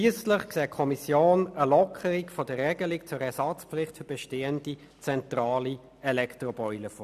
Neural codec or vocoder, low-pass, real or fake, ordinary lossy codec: none; 14.4 kHz; real; none